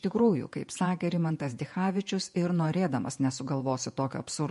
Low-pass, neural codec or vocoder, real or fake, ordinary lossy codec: 14.4 kHz; vocoder, 48 kHz, 128 mel bands, Vocos; fake; MP3, 48 kbps